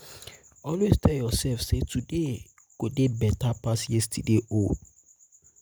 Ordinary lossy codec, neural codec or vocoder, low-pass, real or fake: none; none; none; real